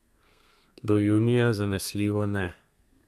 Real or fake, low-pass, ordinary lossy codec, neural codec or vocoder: fake; 14.4 kHz; none; codec, 32 kHz, 1.9 kbps, SNAC